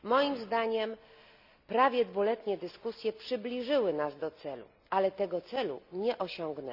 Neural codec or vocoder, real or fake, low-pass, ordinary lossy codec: none; real; 5.4 kHz; none